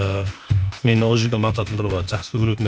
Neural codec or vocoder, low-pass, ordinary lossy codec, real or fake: codec, 16 kHz, 0.8 kbps, ZipCodec; none; none; fake